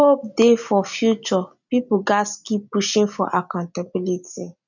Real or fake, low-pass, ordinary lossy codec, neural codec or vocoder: real; 7.2 kHz; none; none